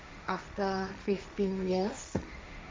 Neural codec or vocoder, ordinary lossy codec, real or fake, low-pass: codec, 16 kHz, 1.1 kbps, Voila-Tokenizer; none; fake; none